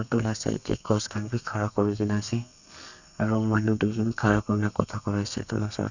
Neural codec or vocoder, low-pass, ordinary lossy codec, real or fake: codec, 32 kHz, 1.9 kbps, SNAC; 7.2 kHz; none; fake